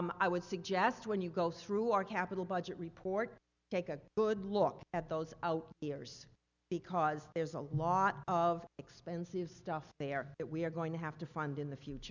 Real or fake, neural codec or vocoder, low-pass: real; none; 7.2 kHz